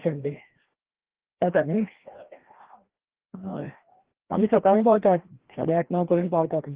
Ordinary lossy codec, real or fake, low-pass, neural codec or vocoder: Opus, 16 kbps; fake; 3.6 kHz; codec, 16 kHz, 1 kbps, FreqCodec, larger model